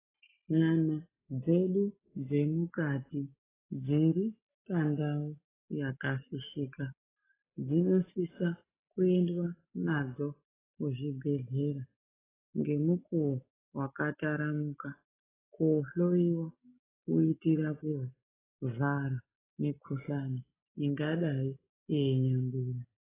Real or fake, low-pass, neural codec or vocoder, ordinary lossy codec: real; 3.6 kHz; none; AAC, 16 kbps